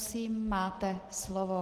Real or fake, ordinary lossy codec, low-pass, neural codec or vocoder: real; Opus, 16 kbps; 14.4 kHz; none